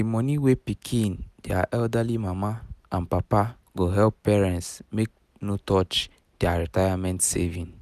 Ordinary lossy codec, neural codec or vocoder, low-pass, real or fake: none; none; 14.4 kHz; real